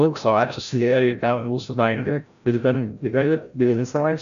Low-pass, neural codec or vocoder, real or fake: 7.2 kHz; codec, 16 kHz, 0.5 kbps, FreqCodec, larger model; fake